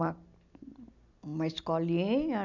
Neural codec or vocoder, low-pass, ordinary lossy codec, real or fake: none; 7.2 kHz; none; real